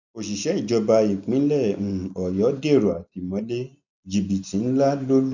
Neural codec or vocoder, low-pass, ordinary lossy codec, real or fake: none; 7.2 kHz; none; real